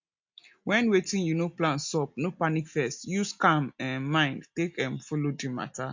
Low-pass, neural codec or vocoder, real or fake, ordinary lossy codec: 7.2 kHz; none; real; MP3, 48 kbps